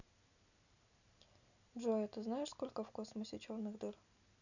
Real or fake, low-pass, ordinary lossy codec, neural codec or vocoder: real; 7.2 kHz; none; none